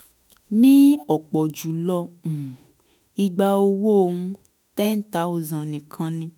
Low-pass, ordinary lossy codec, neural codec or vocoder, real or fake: none; none; autoencoder, 48 kHz, 32 numbers a frame, DAC-VAE, trained on Japanese speech; fake